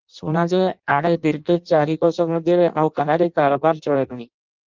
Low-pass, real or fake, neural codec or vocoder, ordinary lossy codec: 7.2 kHz; fake; codec, 16 kHz in and 24 kHz out, 0.6 kbps, FireRedTTS-2 codec; Opus, 24 kbps